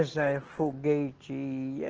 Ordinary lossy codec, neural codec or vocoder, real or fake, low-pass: Opus, 16 kbps; none; real; 7.2 kHz